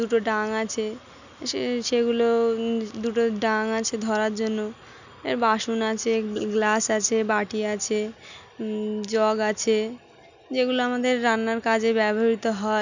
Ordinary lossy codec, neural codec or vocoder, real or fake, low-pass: none; none; real; 7.2 kHz